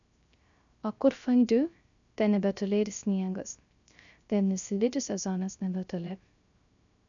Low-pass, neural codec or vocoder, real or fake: 7.2 kHz; codec, 16 kHz, 0.3 kbps, FocalCodec; fake